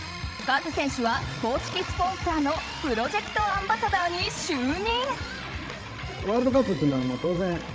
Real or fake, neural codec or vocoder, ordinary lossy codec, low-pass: fake; codec, 16 kHz, 16 kbps, FreqCodec, larger model; none; none